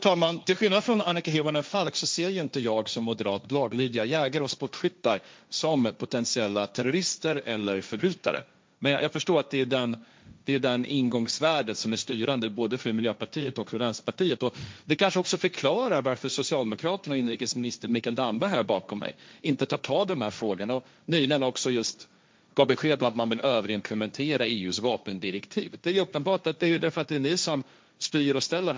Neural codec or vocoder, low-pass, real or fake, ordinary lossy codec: codec, 16 kHz, 1.1 kbps, Voila-Tokenizer; none; fake; none